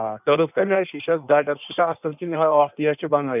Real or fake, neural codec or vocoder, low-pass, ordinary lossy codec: fake; codec, 16 kHz in and 24 kHz out, 1.1 kbps, FireRedTTS-2 codec; 3.6 kHz; none